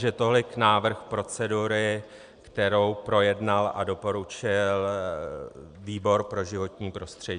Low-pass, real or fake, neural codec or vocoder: 9.9 kHz; real; none